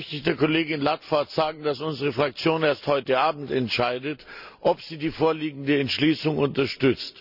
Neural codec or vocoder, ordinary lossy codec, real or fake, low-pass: none; none; real; 5.4 kHz